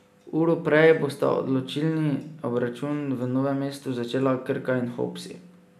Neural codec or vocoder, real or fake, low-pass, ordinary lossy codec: none; real; 14.4 kHz; none